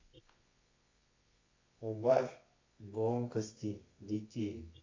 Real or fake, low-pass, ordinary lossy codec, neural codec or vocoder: fake; 7.2 kHz; AAC, 32 kbps; codec, 24 kHz, 0.9 kbps, WavTokenizer, medium music audio release